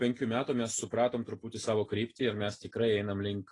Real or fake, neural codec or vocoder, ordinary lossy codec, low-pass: real; none; AAC, 32 kbps; 10.8 kHz